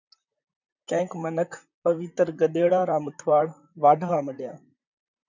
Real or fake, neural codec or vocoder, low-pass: fake; vocoder, 44.1 kHz, 128 mel bands, Pupu-Vocoder; 7.2 kHz